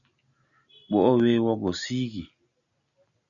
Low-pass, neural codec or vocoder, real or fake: 7.2 kHz; none; real